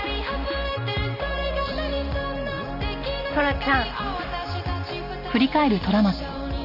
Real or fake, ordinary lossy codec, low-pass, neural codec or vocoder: real; none; 5.4 kHz; none